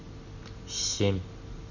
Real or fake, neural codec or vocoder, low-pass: real; none; 7.2 kHz